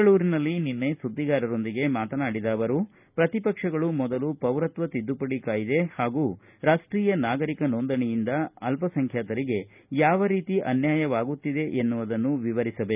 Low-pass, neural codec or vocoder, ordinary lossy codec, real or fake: 3.6 kHz; none; none; real